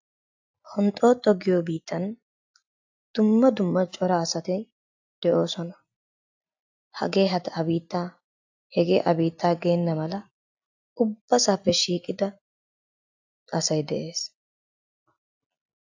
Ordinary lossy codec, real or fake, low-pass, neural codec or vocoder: AAC, 48 kbps; real; 7.2 kHz; none